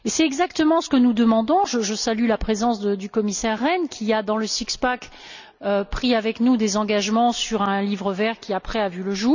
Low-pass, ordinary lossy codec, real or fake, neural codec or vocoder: 7.2 kHz; none; real; none